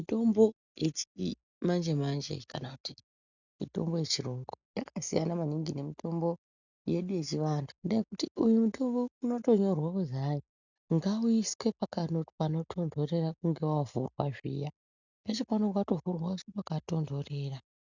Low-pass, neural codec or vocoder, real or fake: 7.2 kHz; none; real